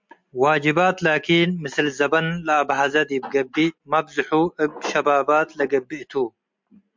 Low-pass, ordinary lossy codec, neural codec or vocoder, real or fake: 7.2 kHz; MP3, 64 kbps; none; real